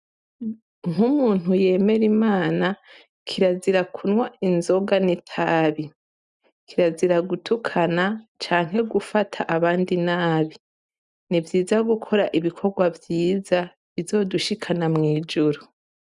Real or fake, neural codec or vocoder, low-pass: real; none; 10.8 kHz